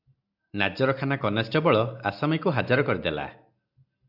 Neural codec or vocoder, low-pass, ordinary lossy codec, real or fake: none; 5.4 kHz; AAC, 48 kbps; real